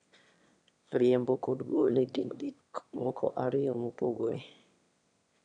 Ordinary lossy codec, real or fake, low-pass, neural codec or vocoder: none; fake; 9.9 kHz; autoencoder, 22.05 kHz, a latent of 192 numbers a frame, VITS, trained on one speaker